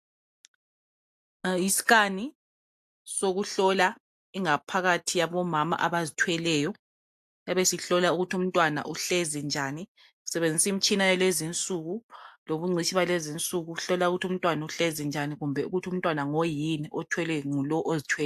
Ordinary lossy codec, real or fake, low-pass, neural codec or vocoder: AAC, 96 kbps; real; 14.4 kHz; none